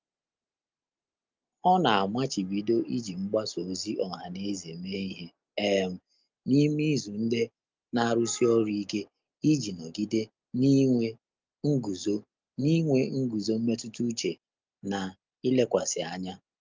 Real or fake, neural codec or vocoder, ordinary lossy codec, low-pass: real; none; Opus, 24 kbps; 7.2 kHz